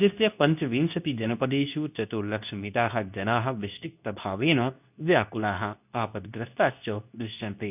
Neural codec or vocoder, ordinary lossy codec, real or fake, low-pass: codec, 24 kHz, 0.9 kbps, WavTokenizer, medium speech release version 2; none; fake; 3.6 kHz